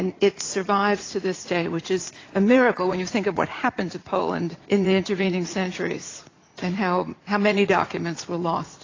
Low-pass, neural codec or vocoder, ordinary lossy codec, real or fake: 7.2 kHz; vocoder, 44.1 kHz, 80 mel bands, Vocos; AAC, 32 kbps; fake